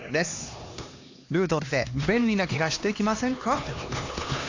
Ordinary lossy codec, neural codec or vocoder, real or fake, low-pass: AAC, 48 kbps; codec, 16 kHz, 2 kbps, X-Codec, HuBERT features, trained on LibriSpeech; fake; 7.2 kHz